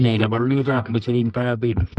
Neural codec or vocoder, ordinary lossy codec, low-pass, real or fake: codec, 24 kHz, 0.9 kbps, WavTokenizer, medium music audio release; none; 10.8 kHz; fake